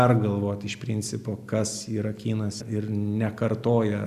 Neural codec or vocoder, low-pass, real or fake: none; 14.4 kHz; real